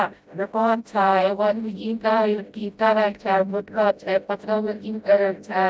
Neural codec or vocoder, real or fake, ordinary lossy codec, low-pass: codec, 16 kHz, 0.5 kbps, FreqCodec, smaller model; fake; none; none